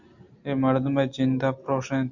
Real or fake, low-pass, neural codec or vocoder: real; 7.2 kHz; none